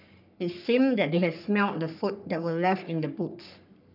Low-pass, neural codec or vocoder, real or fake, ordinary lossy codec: 5.4 kHz; codec, 44.1 kHz, 3.4 kbps, Pupu-Codec; fake; none